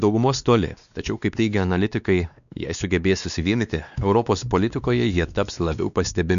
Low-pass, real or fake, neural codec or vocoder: 7.2 kHz; fake; codec, 16 kHz, 2 kbps, X-Codec, WavLM features, trained on Multilingual LibriSpeech